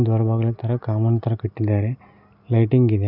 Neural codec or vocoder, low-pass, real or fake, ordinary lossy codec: none; 5.4 kHz; real; none